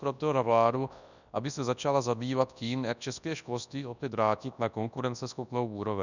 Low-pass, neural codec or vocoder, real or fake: 7.2 kHz; codec, 24 kHz, 0.9 kbps, WavTokenizer, large speech release; fake